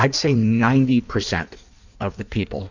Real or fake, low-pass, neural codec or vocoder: fake; 7.2 kHz; codec, 16 kHz in and 24 kHz out, 1.1 kbps, FireRedTTS-2 codec